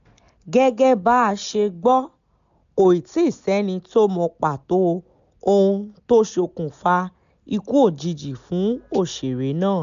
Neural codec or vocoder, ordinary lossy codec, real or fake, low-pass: none; AAC, 96 kbps; real; 7.2 kHz